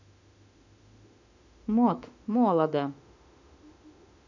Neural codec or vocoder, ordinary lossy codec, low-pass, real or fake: autoencoder, 48 kHz, 32 numbers a frame, DAC-VAE, trained on Japanese speech; none; 7.2 kHz; fake